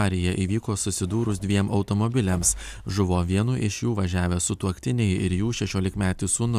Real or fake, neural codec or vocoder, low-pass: real; none; 14.4 kHz